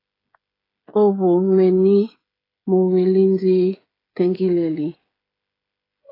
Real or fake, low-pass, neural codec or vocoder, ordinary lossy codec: fake; 5.4 kHz; codec, 16 kHz, 16 kbps, FreqCodec, smaller model; AAC, 24 kbps